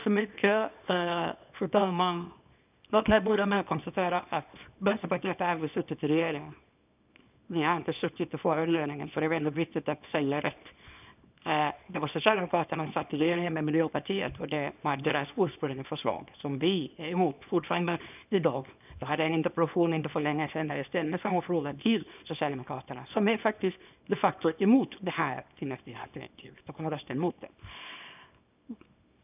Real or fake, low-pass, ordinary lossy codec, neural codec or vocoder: fake; 3.6 kHz; none; codec, 24 kHz, 0.9 kbps, WavTokenizer, small release